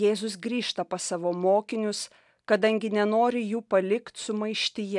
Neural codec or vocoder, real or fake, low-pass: none; real; 10.8 kHz